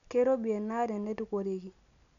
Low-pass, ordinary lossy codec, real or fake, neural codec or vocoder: 7.2 kHz; none; real; none